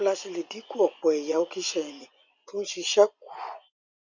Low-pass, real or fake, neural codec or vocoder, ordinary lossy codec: 7.2 kHz; fake; vocoder, 24 kHz, 100 mel bands, Vocos; none